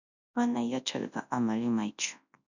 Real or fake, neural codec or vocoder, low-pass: fake; codec, 24 kHz, 0.9 kbps, WavTokenizer, large speech release; 7.2 kHz